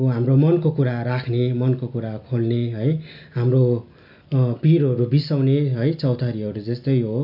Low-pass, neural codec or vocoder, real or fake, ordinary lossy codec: 5.4 kHz; none; real; none